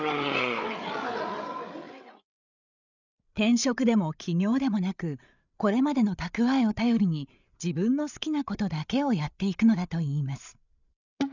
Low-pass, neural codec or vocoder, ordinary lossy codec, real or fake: 7.2 kHz; codec, 16 kHz, 8 kbps, FreqCodec, larger model; none; fake